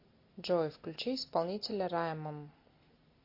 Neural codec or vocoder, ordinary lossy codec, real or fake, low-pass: none; MP3, 32 kbps; real; 5.4 kHz